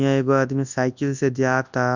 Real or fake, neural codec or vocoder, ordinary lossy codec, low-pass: fake; codec, 24 kHz, 0.9 kbps, WavTokenizer, large speech release; none; 7.2 kHz